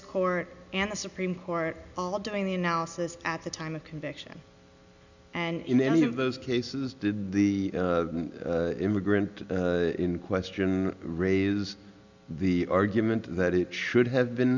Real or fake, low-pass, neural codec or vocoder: real; 7.2 kHz; none